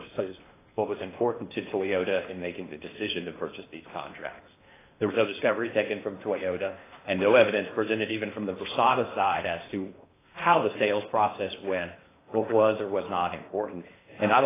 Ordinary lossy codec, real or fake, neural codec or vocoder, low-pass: AAC, 16 kbps; fake; codec, 16 kHz in and 24 kHz out, 0.8 kbps, FocalCodec, streaming, 65536 codes; 3.6 kHz